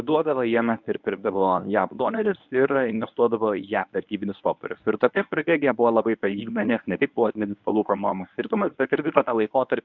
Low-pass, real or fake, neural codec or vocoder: 7.2 kHz; fake; codec, 24 kHz, 0.9 kbps, WavTokenizer, medium speech release version 1